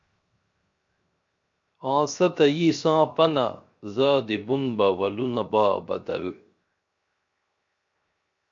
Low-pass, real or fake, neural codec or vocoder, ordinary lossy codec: 7.2 kHz; fake; codec, 16 kHz, 0.7 kbps, FocalCodec; MP3, 48 kbps